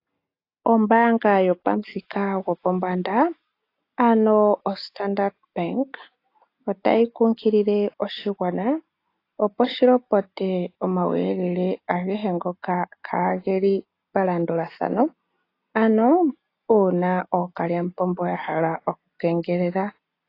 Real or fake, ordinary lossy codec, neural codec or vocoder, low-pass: real; AAC, 32 kbps; none; 5.4 kHz